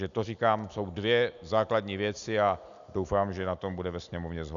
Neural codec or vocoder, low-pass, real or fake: none; 7.2 kHz; real